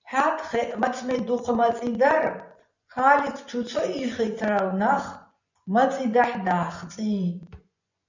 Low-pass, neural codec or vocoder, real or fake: 7.2 kHz; vocoder, 24 kHz, 100 mel bands, Vocos; fake